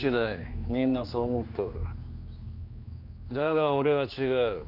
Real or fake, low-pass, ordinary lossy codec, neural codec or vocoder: fake; 5.4 kHz; none; codec, 16 kHz, 2 kbps, X-Codec, HuBERT features, trained on general audio